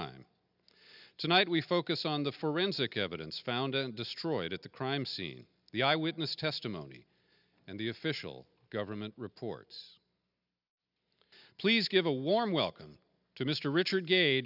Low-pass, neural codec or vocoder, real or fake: 5.4 kHz; none; real